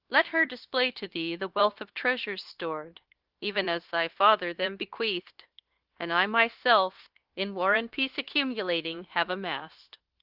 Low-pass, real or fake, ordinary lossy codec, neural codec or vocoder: 5.4 kHz; fake; Opus, 16 kbps; codec, 16 kHz, 0.9 kbps, LongCat-Audio-Codec